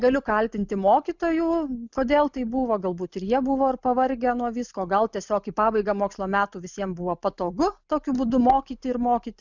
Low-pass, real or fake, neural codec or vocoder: 7.2 kHz; real; none